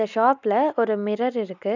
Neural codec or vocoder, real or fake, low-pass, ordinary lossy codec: none; real; 7.2 kHz; none